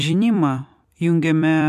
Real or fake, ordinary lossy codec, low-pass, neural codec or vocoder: fake; MP3, 64 kbps; 14.4 kHz; vocoder, 44.1 kHz, 128 mel bands every 256 samples, BigVGAN v2